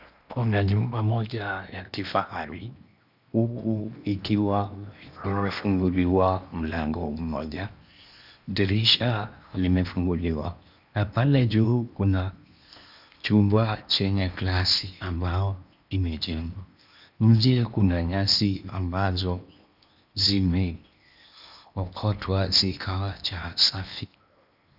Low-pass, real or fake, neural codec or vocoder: 5.4 kHz; fake; codec, 16 kHz in and 24 kHz out, 0.8 kbps, FocalCodec, streaming, 65536 codes